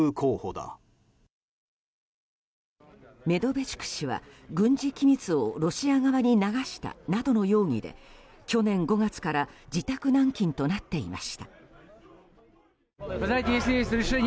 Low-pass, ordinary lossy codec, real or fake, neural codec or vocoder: none; none; real; none